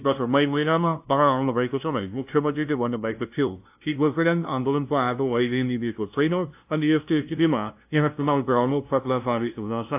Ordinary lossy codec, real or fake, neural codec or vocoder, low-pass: AAC, 32 kbps; fake; codec, 16 kHz, 0.5 kbps, FunCodec, trained on LibriTTS, 25 frames a second; 3.6 kHz